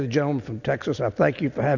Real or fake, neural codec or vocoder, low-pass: real; none; 7.2 kHz